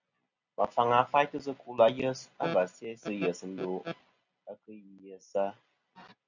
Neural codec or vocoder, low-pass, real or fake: none; 7.2 kHz; real